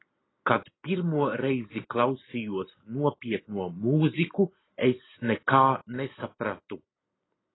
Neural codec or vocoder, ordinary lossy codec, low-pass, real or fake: none; AAC, 16 kbps; 7.2 kHz; real